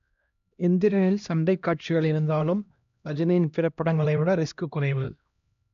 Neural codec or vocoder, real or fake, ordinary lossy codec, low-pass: codec, 16 kHz, 1 kbps, X-Codec, HuBERT features, trained on LibriSpeech; fake; none; 7.2 kHz